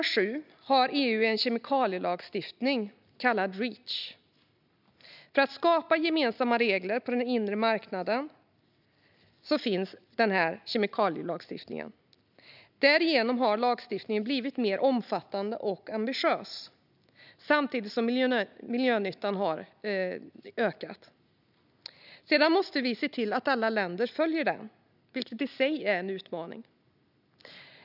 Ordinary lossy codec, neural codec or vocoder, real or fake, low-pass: none; none; real; 5.4 kHz